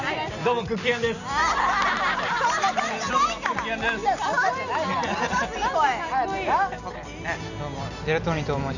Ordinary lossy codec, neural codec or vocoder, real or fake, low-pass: none; none; real; 7.2 kHz